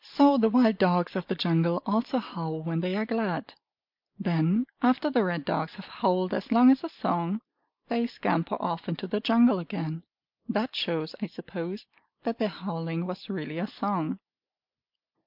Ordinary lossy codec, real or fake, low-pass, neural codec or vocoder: MP3, 48 kbps; real; 5.4 kHz; none